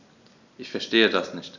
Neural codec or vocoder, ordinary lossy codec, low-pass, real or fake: none; none; 7.2 kHz; real